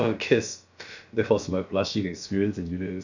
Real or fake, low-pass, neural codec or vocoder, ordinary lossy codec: fake; 7.2 kHz; codec, 16 kHz, about 1 kbps, DyCAST, with the encoder's durations; none